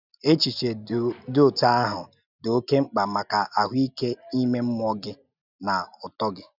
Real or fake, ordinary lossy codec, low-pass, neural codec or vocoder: real; none; 5.4 kHz; none